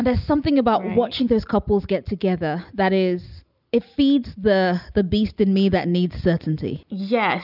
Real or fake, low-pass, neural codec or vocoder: real; 5.4 kHz; none